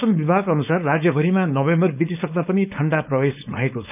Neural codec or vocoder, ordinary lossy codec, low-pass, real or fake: codec, 16 kHz, 4.8 kbps, FACodec; none; 3.6 kHz; fake